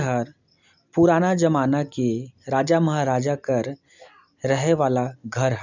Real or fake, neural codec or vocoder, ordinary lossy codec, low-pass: real; none; none; 7.2 kHz